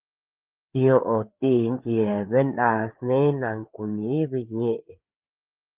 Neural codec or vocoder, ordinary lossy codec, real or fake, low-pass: codec, 16 kHz, 4 kbps, FreqCodec, larger model; Opus, 32 kbps; fake; 3.6 kHz